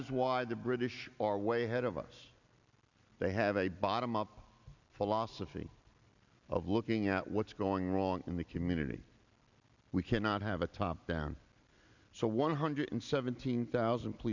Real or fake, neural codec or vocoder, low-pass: real; none; 7.2 kHz